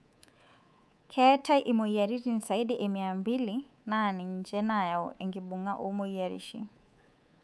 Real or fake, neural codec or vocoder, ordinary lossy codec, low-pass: fake; codec, 24 kHz, 3.1 kbps, DualCodec; none; none